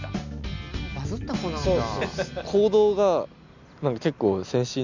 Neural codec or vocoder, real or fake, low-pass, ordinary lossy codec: none; real; 7.2 kHz; none